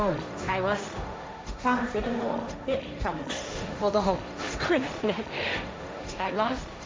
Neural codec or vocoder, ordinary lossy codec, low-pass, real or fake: codec, 16 kHz, 1.1 kbps, Voila-Tokenizer; none; none; fake